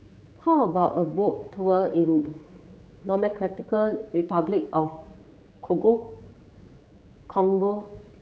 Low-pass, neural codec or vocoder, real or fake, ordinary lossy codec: none; codec, 16 kHz, 4 kbps, X-Codec, HuBERT features, trained on general audio; fake; none